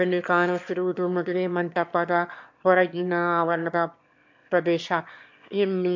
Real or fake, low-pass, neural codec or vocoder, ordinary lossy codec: fake; 7.2 kHz; autoencoder, 22.05 kHz, a latent of 192 numbers a frame, VITS, trained on one speaker; MP3, 48 kbps